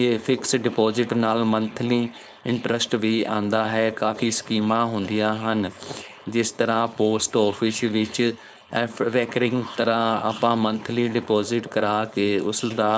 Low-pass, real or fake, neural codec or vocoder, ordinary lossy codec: none; fake; codec, 16 kHz, 4.8 kbps, FACodec; none